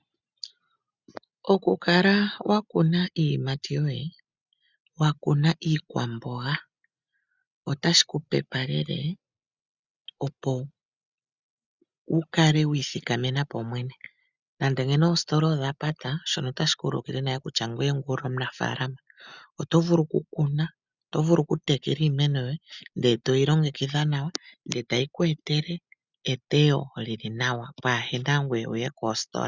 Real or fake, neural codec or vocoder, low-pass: real; none; 7.2 kHz